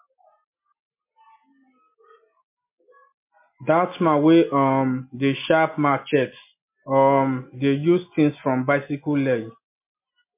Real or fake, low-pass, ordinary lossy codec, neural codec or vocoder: real; 3.6 kHz; MP3, 24 kbps; none